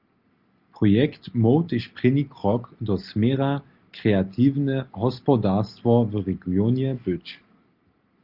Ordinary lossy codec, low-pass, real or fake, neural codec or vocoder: Opus, 32 kbps; 5.4 kHz; real; none